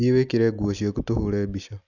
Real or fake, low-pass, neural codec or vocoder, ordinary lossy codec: real; 7.2 kHz; none; none